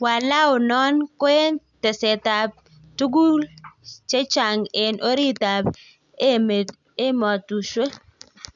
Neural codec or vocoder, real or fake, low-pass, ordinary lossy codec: none; real; 7.2 kHz; none